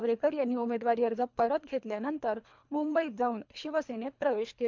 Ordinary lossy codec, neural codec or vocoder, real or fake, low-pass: MP3, 64 kbps; codec, 24 kHz, 3 kbps, HILCodec; fake; 7.2 kHz